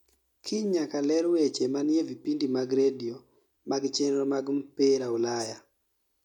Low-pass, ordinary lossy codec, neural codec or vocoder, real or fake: 19.8 kHz; none; none; real